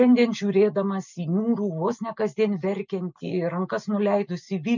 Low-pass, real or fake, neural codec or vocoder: 7.2 kHz; real; none